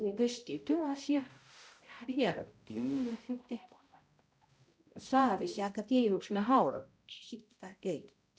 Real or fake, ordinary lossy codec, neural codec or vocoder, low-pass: fake; none; codec, 16 kHz, 0.5 kbps, X-Codec, HuBERT features, trained on balanced general audio; none